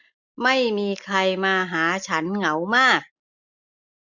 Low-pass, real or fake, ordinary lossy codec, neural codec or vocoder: 7.2 kHz; real; none; none